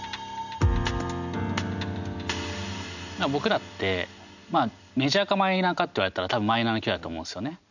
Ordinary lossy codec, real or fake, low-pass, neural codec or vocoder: none; real; 7.2 kHz; none